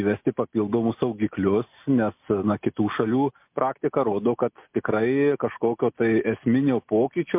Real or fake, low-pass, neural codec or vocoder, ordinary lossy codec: real; 3.6 kHz; none; MP3, 32 kbps